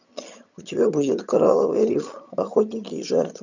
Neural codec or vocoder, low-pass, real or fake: vocoder, 22.05 kHz, 80 mel bands, HiFi-GAN; 7.2 kHz; fake